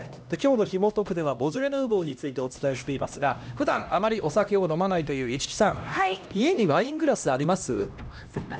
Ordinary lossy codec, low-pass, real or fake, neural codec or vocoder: none; none; fake; codec, 16 kHz, 1 kbps, X-Codec, HuBERT features, trained on LibriSpeech